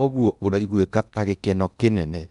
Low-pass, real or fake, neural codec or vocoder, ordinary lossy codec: 10.8 kHz; fake; codec, 16 kHz in and 24 kHz out, 0.8 kbps, FocalCodec, streaming, 65536 codes; none